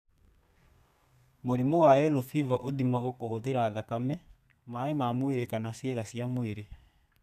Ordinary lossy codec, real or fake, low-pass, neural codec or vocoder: none; fake; 14.4 kHz; codec, 32 kHz, 1.9 kbps, SNAC